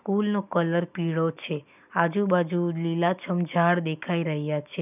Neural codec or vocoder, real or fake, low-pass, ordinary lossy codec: none; real; 3.6 kHz; none